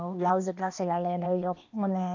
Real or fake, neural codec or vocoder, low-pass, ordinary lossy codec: fake; codec, 16 kHz, 0.8 kbps, ZipCodec; 7.2 kHz; none